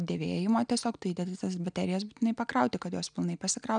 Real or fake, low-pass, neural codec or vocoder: real; 9.9 kHz; none